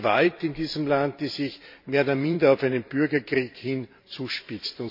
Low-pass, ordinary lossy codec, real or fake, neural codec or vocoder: 5.4 kHz; MP3, 32 kbps; fake; vocoder, 44.1 kHz, 128 mel bands every 512 samples, BigVGAN v2